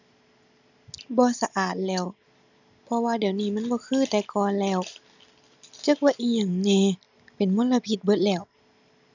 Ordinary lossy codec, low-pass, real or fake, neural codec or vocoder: none; 7.2 kHz; real; none